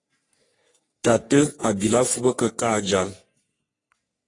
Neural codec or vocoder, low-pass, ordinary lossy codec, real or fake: codec, 44.1 kHz, 3.4 kbps, Pupu-Codec; 10.8 kHz; AAC, 32 kbps; fake